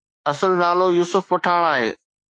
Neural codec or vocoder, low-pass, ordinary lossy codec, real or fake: autoencoder, 48 kHz, 32 numbers a frame, DAC-VAE, trained on Japanese speech; 9.9 kHz; AAC, 48 kbps; fake